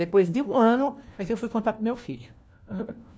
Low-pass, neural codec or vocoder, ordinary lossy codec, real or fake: none; codec, 16 kHz, 1 kbps, FunCodec, trained on LibriTTS, 50 frames a second; none; fake